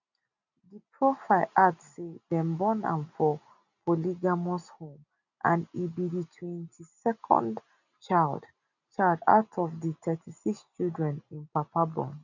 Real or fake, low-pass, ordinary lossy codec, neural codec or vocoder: real; 7.2 kHz; none; none